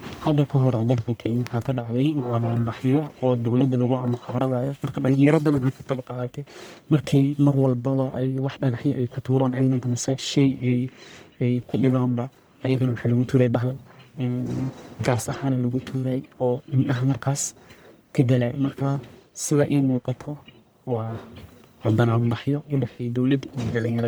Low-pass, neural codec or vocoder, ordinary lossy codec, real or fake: none; codec, 44.1 kHz, 1.7 kbps, Pupu-Codec; none; fake